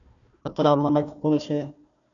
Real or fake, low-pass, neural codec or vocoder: fake; 7.2 kHz; codec, 16 kHz, 1 kbps, FunCodec, trained on Chinese and English, 50 frames a second